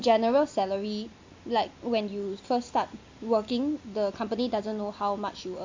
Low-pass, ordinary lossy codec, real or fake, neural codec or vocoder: 7.2 kHz; MP3, 48 kbps; real; none